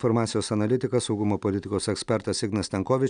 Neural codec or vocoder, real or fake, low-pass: none; real; 9.9 kHz